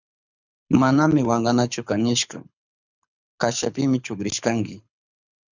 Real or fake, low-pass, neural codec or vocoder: fake; 7.2 kHz; codec, 24 kHz, 6 kbps, HILCodec